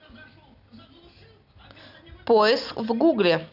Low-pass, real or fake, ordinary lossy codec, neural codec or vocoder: 5.4 kHz; real; none; none